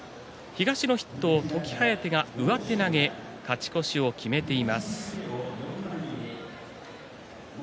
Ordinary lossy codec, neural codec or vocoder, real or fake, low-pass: none; none; real; none